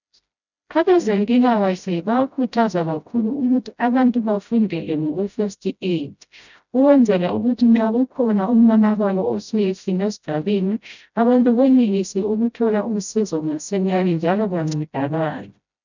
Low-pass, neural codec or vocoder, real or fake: 7.2 kHz; codec, 16 kHz, 0.5 kbps, FreqCodec, smaller model; fake